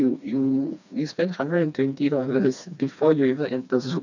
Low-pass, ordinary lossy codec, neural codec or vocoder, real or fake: 7.2 kHz; none; codec, 16 kHz, 2 kbps, FreqCodec, smaller model; fake